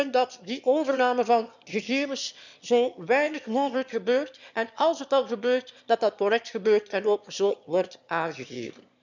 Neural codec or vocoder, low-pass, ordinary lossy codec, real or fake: autoencoder, 22.05 kHz, a latent of 192 numbers a frame, VITS, trained on one speaker; 7.2 kHz; none; fake